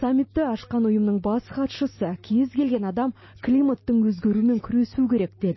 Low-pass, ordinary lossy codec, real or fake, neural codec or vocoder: 7.2 kHz; MP3, 24 kbps; real; none